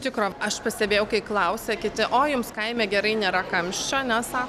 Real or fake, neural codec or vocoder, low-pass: real; none; 14.4 kHz